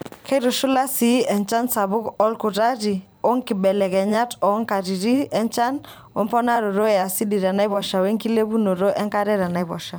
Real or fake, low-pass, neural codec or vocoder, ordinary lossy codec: fake; none; vocoder, 44.1 kHz, 128 mel bands every 512 samples, BigVGAN v2; none